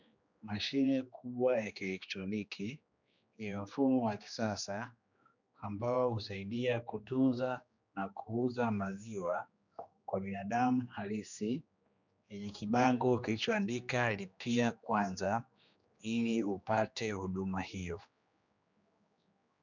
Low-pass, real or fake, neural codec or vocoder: 7.2 kHz; fake; codec, 16 kHz, 2 kbps, X-Codec, HuBERT features, trained on general audio